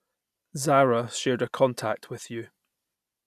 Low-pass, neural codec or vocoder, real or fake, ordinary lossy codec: 14.4 kHz; none; real; none